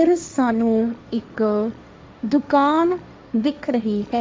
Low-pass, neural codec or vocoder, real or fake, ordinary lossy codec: none; codec, 16 kHz, 1.1 kbps, Voila-Tokenizer; fake; none